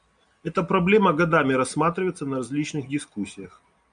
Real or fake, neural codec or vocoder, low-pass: real; none; 9.9 kHz